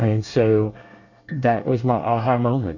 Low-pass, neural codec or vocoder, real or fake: 7.2 kHz; codec, 24 kHz, 1 kbps, SNAC; fake